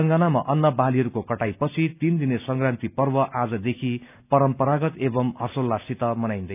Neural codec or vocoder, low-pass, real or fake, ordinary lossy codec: none; 3.6 kHz; real; none